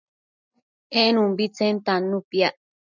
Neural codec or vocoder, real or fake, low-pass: none; real; 7.2 kHz